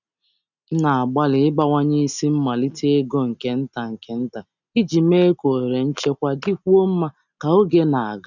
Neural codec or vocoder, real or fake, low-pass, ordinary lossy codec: none; real; 7.2 kHz; none